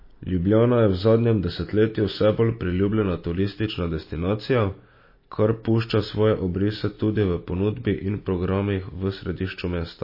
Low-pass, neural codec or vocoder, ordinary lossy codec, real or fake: 5.4 kHz; none; MP3, 24 kbps; real